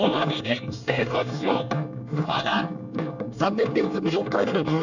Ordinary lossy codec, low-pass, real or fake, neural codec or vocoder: none; 7.2 kHz; fake; codec, 24 kHz, 1 kbps, SNAC